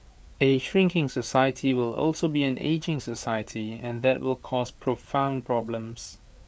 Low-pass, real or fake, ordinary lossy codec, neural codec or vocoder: none; fake; none; codec, 16 kHz, 4 kbps, FreqCodec, larger model